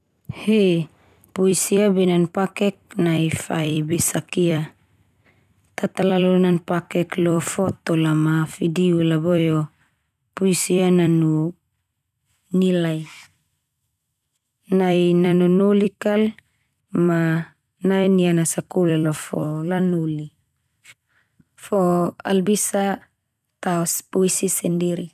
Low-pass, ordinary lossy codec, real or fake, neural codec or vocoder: 14.4 kHz; none; fake; vocoder, 44.1 kHz, 128 mel bands every 256 samples, BigVGAN v2